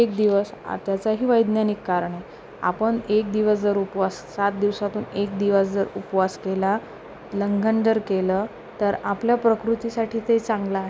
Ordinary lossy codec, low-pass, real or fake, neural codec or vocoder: none; none; real; none